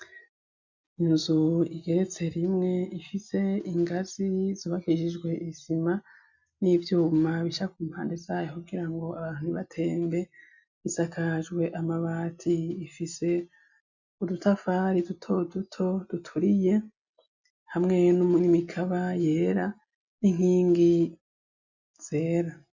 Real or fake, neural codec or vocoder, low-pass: real; none; 7.2 kHz